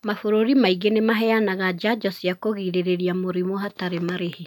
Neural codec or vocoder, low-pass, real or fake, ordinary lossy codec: none; 19.8 kHz; real; none